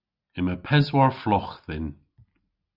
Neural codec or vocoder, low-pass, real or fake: none; 5.4 kHz; real